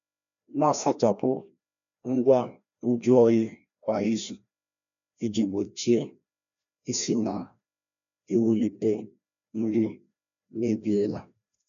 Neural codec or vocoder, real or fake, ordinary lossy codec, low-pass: codec, 16 kHz, 1 kbps, FreqCodec, larger model; fake; none; 7.2 kHz